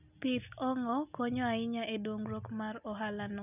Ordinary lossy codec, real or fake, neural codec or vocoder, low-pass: none; real; none; 3.6 kHz